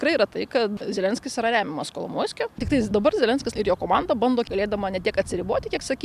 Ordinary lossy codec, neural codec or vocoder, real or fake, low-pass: AAC, 96 kbps; none; real; 14.4 kHz